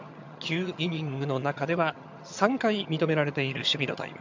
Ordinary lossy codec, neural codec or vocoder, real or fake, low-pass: MP3, 64 kbps; vocoder, 22.05 kHz, 80 mel bands, HiFi-GAN; fake; 7.2 kHz